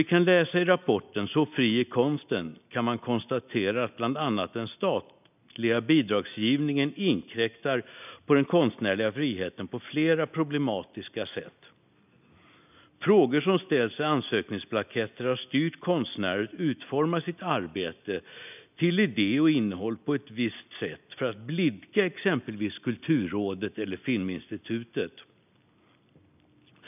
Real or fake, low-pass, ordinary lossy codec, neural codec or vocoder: real; 3.6 kHz; none; none